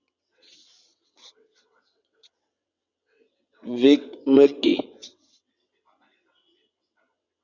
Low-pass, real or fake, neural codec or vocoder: 7.2 kHz; fake; vocoder, 22.05 kHz, 80 mel bands, WaveNeXt